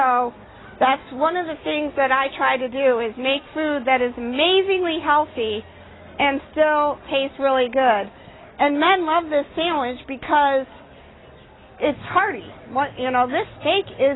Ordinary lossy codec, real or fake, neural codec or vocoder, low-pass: AAC, 16 kbps; real; none; 7.2 kHz